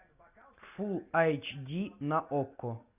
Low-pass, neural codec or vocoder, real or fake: 3.6 kHz; none; real